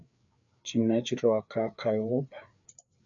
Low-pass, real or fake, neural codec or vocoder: 7.2 kHz; fake; codec, 16 kHz, 4 kbps, FreqCodec, larger model